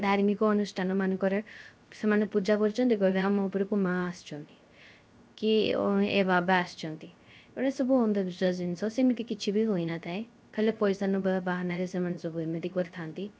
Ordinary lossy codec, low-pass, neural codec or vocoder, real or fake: none; none; codec, 16 kHz, 0.3 kbps, FocalCodec; fake